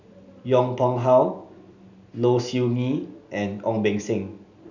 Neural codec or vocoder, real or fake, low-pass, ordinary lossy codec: none; real; 7.2 kHz; none